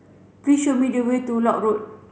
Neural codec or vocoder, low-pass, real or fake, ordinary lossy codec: none; none; real; none